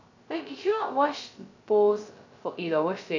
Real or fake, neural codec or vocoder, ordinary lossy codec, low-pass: fake; codec, 16 kHz, 0.2 kbps, FocalCodec; AAC, 48 kbps; 7.2 kHz